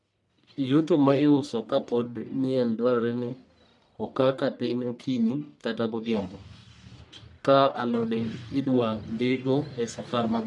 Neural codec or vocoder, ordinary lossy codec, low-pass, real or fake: codec, 44.1 kHz, 1.7 kbps, Pupu-Codec; none; 10.8 kHz; fake